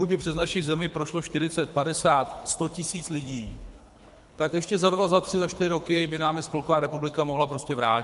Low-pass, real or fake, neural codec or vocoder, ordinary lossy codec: 10.8 kHz; fake; codec, 24 kHz, 3 kbps, HILCodec; MP3, 64 kbps